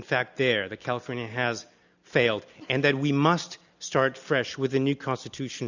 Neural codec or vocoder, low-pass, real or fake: none; 7.2 kHz; real